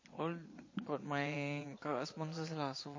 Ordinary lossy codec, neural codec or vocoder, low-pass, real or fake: MP3, 32 kbps; vocoder, 22.05 kHz, 80 mel bands, Vocos; 7.2 kHz; fake